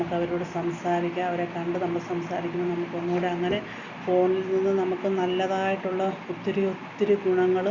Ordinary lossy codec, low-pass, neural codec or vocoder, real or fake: Opus, 64 kbps; 7.2 kHz; none; real